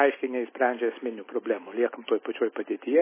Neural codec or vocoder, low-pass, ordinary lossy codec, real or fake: none; 3.6 kHz; MP3, 16 kbps; real